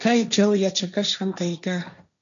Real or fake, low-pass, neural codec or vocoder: fake; 7.2 kHz; codec, 16 kHz, 1.1 kbps, Voila-Tokenizer